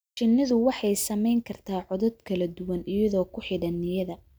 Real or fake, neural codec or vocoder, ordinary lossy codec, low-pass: real; none; none; none